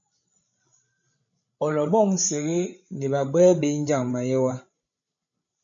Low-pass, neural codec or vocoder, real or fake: 7.2 kHz; codec, 16 kHz, 16 kbps, FreqCodec, larger model; fake